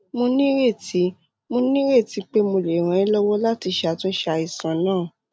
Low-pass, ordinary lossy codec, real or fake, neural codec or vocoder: none; none; real; none